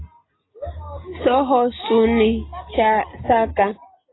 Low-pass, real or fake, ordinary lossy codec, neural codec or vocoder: 7.2 kHz; real; AAC, 16 kbps; none